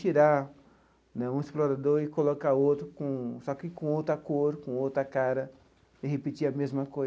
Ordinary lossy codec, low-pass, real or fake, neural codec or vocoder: none; none; real; none